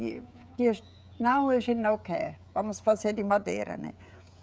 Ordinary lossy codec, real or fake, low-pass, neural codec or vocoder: none; fake; none; codec, 16 kHz, 16 kbps, FreqCodec, smaller model